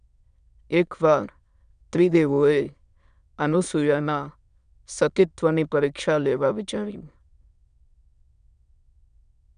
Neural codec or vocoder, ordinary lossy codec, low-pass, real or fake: autoencoder, 22.05 kHz, a latent of 192 numbers a frame, VITS, trained on many speakers; none; 9.9 kHz; fake